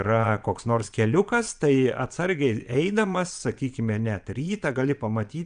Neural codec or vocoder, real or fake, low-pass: vocoder, 22.05 kHz, 80 mel bands, Vocos; fake; 9.9 kHz